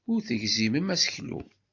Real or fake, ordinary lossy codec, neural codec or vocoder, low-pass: real; AAC, 48 kbps; none; 7.2 kHz